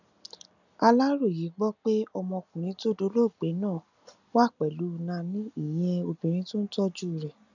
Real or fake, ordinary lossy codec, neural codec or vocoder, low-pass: real; none; none; 7.2 kHz